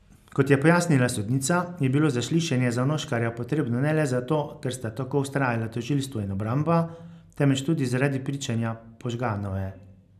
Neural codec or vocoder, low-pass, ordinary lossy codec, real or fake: none; 14.4 kHz; none; real